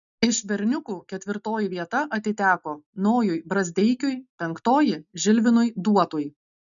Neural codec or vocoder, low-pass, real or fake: none; 7.2 kHz; real